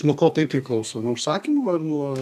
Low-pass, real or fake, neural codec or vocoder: 14.4 kHz; fake; codec, 32 kHz, 1.9 kbps, SNAC